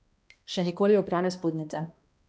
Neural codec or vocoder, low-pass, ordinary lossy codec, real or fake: codec, 16 kHz, 1 kbps, X-Codec, HuBERT features, trained on balanced general audio; none; none; fake